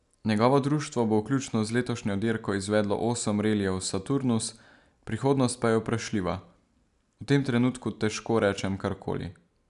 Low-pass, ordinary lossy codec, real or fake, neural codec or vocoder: 10.8 kHz; none; real; none